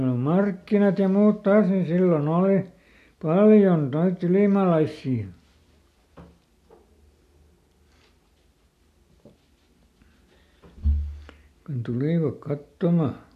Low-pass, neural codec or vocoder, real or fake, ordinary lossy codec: 14.4 kHz; none; real; AAC, 64 kbps